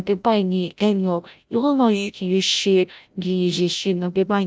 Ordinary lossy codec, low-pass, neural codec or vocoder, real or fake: none; none; codec, 16 kHz, 0.5 kbps, FreqCodec, larger model; fake